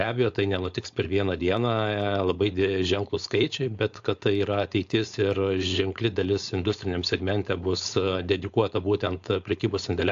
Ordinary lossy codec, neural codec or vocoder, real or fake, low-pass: AAC, 64 kbps; codec, 16 kHz, 4.8 kbps, FACodec; fake; 7.2 kHz